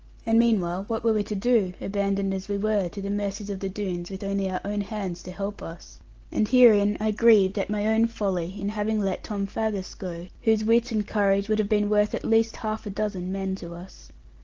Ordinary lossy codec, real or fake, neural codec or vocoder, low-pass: Opus, 16 kbps; real; none; 7.2 kHz